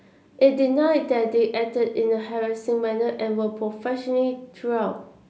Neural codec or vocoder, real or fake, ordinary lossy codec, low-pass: none; real; none; none